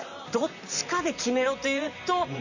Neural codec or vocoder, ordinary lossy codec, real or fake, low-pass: vocoder, 44.1 kHz, 128 mel bands, Pupu-Vocoder; none; fake; 7.2 kHz